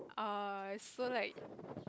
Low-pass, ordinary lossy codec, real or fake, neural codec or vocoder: none; none; real; none